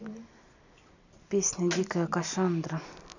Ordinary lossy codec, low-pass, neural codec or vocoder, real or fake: none; 7.2 kHz; none; real